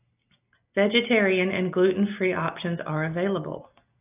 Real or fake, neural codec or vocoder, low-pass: real; none; 3.6 kHz